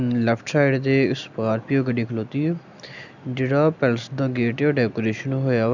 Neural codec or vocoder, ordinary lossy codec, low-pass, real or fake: none; none; 7.2 kHz; real